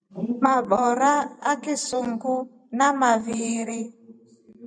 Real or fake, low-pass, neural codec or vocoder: fake; 9.9 kHz; vocoder, 44.1 kHz, 128 mel bands every 512 samples, BigVGAN v2